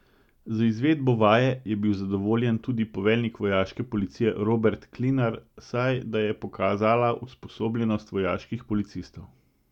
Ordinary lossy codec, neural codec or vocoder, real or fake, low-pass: none; none; real; 19.8 kHz